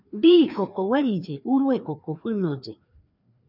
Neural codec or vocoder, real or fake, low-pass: codec, 16 kHz, 2 kbps, FreqCodec, larger model; fake; 5.4 kHz